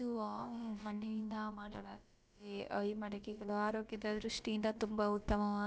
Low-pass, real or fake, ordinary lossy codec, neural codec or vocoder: none; fake; none; codec, 16 kHz, about 1 kbps, DyCAST, with the encoder's durations